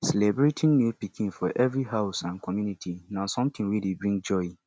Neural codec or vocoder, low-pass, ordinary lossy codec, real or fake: none; none; none; real